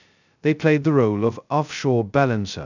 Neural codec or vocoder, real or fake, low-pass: codec, 16 kHz, 0.2 kbps, FocalCodec; fake; 7.2 kHz